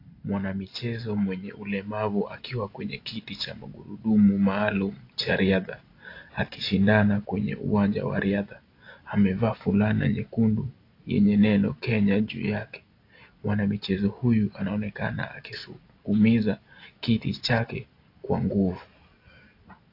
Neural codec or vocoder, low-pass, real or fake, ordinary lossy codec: none; 5.4 kHz; real; AAC, 32 kbps